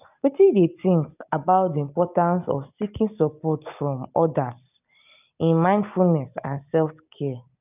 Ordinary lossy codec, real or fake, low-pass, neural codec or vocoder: none; real; 3.6 kHz; none